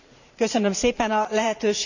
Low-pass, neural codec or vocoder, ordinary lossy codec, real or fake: 7.2 kHz; vocoder, 44.1 kHz, 128 mel bands every 512 samples, BigVGAN v2; none; fake